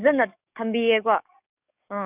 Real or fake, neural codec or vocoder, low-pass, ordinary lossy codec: fake; codec, 44.1 kHz, 7.8 kbps, DAC; 3.6 kHz; none